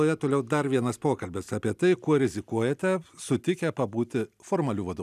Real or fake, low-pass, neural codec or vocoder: real; 14.4 kHz; none